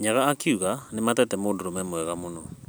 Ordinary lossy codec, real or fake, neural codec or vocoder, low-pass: none; real; none; none